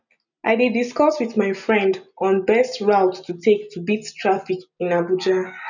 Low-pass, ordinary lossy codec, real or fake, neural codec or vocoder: 7.2 kHz; none; real; none